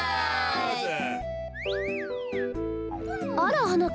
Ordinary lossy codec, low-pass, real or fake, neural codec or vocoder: none; none; real; none